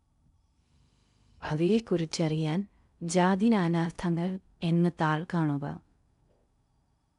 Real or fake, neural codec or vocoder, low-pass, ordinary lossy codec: fake; codec, 16 kHz in and 24 kHz out, 0.6 kbps, FocalCodec, streaming, 2048 codes; 10.8 kHz; none